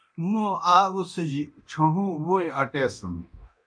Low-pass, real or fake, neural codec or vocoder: 9.9 kHz; fake; codec, 24 kHz, 0.9 kbps, DualCodec